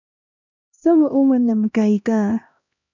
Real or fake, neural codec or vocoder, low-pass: fake; codec, 16 kHz, 1 kbps, X-Codec, HuBERT features, trained on LibriSpeech; 7.2 kHz